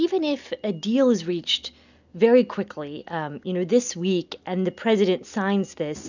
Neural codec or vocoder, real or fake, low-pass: none; real; 7.2 kHz